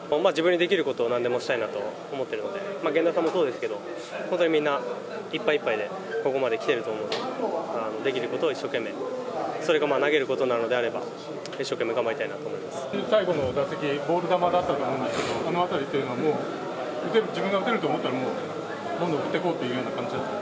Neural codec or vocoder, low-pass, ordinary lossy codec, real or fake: none; none; none; real